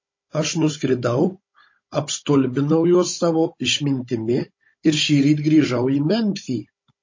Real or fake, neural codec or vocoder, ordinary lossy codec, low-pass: fake; codec, 16 kHz, 16 kbps, FunCodec, trained on Chinese and English, 50 frames a second; MP3, 32 kbps; 7.2 kHz